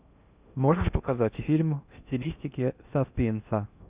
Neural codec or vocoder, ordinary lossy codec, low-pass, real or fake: codec, 16 kHz in and 24 kHz out, 0.8 kbps, FocalCodec, streaming, 65536 codes; Opus, 64 kbps; 3.6 kHz; fake